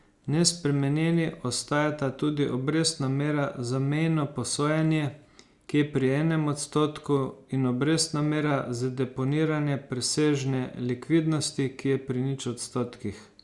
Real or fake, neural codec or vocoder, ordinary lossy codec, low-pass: real; none; Opus, 64 kbps; 10.8 kHz